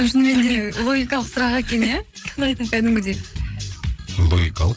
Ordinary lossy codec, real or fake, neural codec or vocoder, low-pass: none; fake; codec, 16 kHz, 8 kbps, FreqCodec, larger model; none